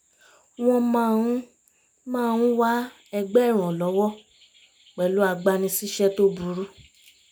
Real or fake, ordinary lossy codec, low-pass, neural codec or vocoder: real; none; none; none